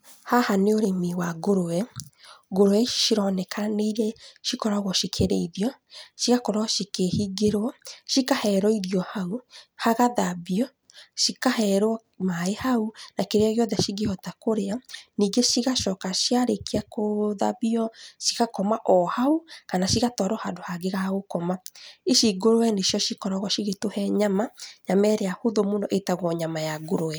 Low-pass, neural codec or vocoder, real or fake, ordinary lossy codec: none; none; real; none